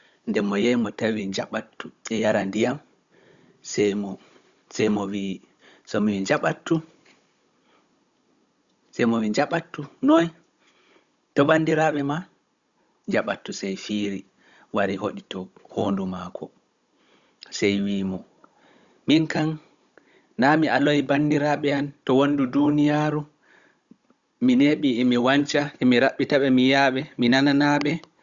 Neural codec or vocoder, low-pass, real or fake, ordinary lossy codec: codec, 16 kHz, 16 kbps, FunCodec, trained on Chinese and English, 50 frames a second; 7.2 kHz; fake; Opus, 64 kbps